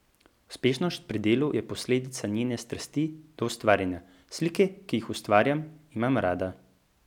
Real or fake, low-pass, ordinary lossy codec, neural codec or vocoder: real; 19.8 kHz; none; none